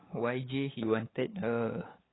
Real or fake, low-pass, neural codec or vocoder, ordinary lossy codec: fake; 7.2 kHz; codec, 16 kHz, 8 kbps, FunCodec, trained on LibriTTS, 25 frames a second; AAC, 16 kbps